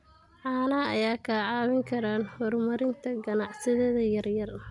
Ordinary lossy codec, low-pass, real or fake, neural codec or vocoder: none; 10.8 kHz; real; none